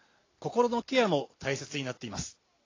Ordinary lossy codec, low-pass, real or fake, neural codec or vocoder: AAC, 32 kbps; 7.2 kHz; real; none